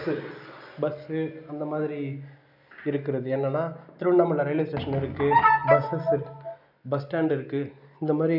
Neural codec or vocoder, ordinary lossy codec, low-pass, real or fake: none; none; 5.4 kHz; real